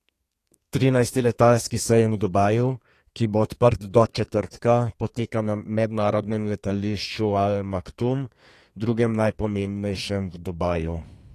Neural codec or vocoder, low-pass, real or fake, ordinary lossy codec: codec, 32 kHz, 1.9 kbps, SNAC; 14.4 kHz; fake; AAC, 48 kbps